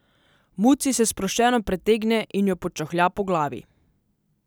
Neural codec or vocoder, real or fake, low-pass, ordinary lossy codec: none; real; none; none